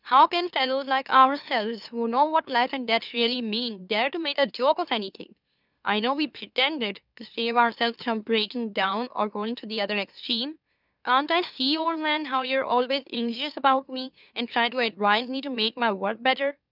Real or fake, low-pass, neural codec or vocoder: fake; 5.4 kHz; autoencoder, 44.1 kHz, a latent of 192 numbers a frame, MeloTTS